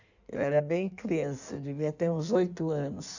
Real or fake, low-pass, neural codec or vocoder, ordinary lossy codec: fake; 7.2 kHz; codec, 16 kHz in and 24 kHz out, 1.1 kbps, FireRedTTS-2 codec; none